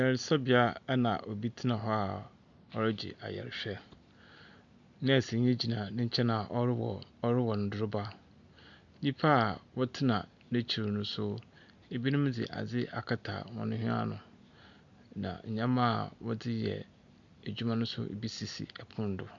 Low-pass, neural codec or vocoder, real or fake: 7.2 kHz; none; real